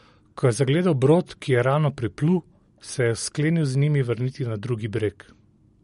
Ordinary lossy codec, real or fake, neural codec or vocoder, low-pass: MP3, 48 kbps; real; none; 10.8 kHz